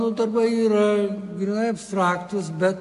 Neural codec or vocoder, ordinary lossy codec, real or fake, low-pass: none; AAC, 96 kbps; real; 10.8 kHz